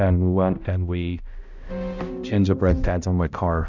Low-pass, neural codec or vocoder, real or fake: 7.2 kHz; codec, 16 kHz, 0.5 kbps, X-Codec, HuBERT features, trained on balanced general audio; fake